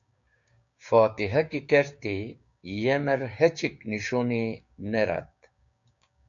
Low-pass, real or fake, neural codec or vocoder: 7.2 kHz; fake; codec, 16 kHz, 6 kbps, DAC